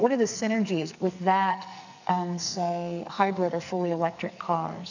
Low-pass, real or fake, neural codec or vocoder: 7.2 kHz; fake; codec, 44.1 kHz, 2.6 kbps, SNAC